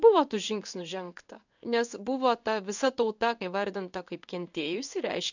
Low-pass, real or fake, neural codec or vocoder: 7.2 kHz; fake; codec, 16 kHz in and 24 kHz out, 1 kbps, XY-Tokenizer